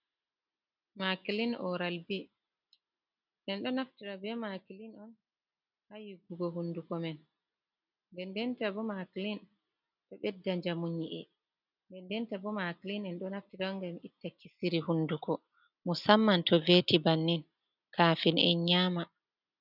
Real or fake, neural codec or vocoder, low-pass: real; none; 5.4 kHz